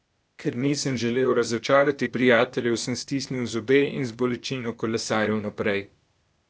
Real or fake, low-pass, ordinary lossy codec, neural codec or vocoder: fake; none; none; codec, 16 kHz, 0.8 kbps, ZipCodec